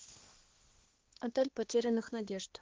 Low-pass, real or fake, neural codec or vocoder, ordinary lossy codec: 7.2 kHz; fake; codec, 16 kHz, 2 kbps, X-Codec, HuBERT features, trained on balanced general audio; Opus, 16 kbps